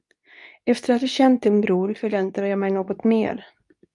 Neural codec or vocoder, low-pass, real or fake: codec, 24 kHz, 0.9 kbps, WavTokenizer, medium speech release version 2; 10.8 kHz; fake